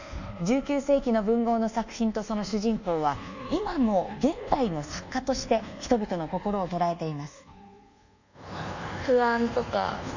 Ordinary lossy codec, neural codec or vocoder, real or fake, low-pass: none; codec, 24 kHz, 1.2 kbps, DualCodec; fake; 7.2 kHz